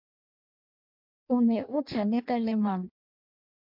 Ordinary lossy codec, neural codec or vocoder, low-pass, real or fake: MP3, 48 kbps; codec, 16 kHz in and 24 kHz out, 0.6 kbps, FireRedTTS-2 codec; 5.4 kHz; fake